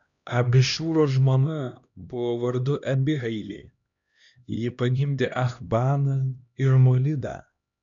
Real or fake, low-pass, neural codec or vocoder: fake; 7.2 kHz; codec, 16 kHz, 1 kbps, X-Codec, HuBERT features, trained on LibriSpeech